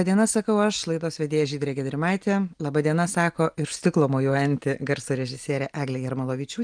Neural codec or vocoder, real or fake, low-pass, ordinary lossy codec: none; real; 9.9 kHz; Opus, 32 kbps